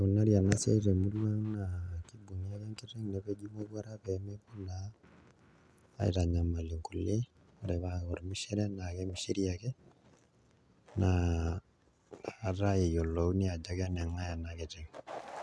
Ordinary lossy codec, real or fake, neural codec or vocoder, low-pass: none; real; none; none